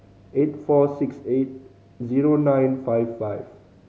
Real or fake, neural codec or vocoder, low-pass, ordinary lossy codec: real; none; none; none